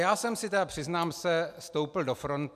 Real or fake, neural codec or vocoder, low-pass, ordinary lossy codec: real; none; 14.4 kHz; MP3, 96 kbps